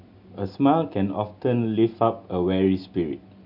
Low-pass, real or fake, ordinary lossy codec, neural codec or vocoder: 5.4 kHz; real; none; none